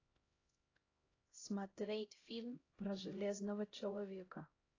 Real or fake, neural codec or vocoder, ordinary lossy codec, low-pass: fake; codec, 16 kHz, 0.5 kbps, X-Codec, HuBERT features, trained on LibriSpeech; AAC, 32 kbps; 7.2 kHz